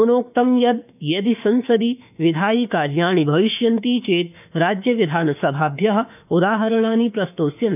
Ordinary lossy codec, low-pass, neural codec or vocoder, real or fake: none; 3.6 kHz; autoencoder, 48 kHz, 32 numbers a frame, DAC-VAE, trained on Japanese speech; fake